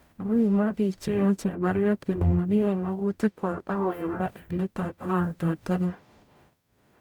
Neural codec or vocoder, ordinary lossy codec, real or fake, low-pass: codec, 44.1 kHz, 0.9 kbps, DAC; none; fake; 19.8 kHz